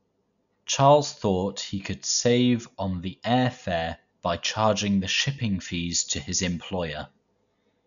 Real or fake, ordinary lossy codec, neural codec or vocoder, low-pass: real; none; none; 7.2 kHz